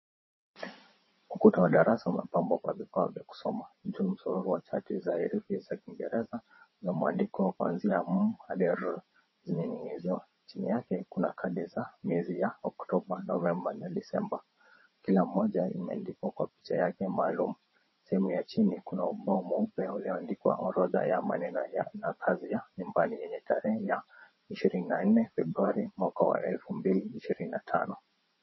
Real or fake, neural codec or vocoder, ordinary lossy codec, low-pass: fake; vocoder, 22.05 kHz, 80 mel bands, WaveNeXt; MP3, 24 kbps; 7.2 kHz